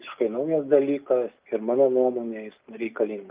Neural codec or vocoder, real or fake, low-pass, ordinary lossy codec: none; real; 3.6 kHz; Opus, 24 kbps